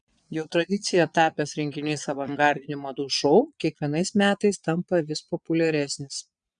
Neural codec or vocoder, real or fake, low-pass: vocoder, 22.05 kHz, 80 mel bands, Vocos; fake; 9.9 kHz